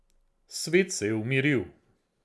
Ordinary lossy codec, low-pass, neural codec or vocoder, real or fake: none; none; none; real